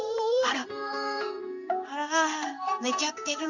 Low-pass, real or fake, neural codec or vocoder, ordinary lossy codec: 7.2 kHz; fake; codec, 16 kHz in and 24 kHz out, 1 kbps, XY-Tokenizer; none